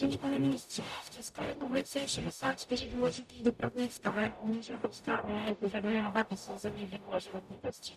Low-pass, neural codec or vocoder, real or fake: 14.4 kHz; codec, 44.1 kHz, 0.9 kbps, DAC; fake